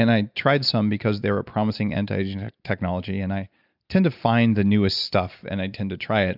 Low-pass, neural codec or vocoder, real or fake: 5.4 kHz; none; real